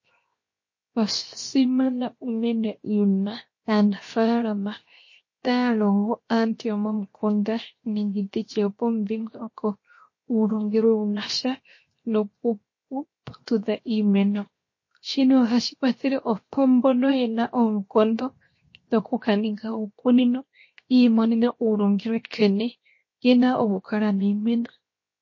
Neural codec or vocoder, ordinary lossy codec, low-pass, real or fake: codec, 16 kHz, 0.7 kbps, FocalCodec; MP3, 32 kbps; 7.2 kHz; fake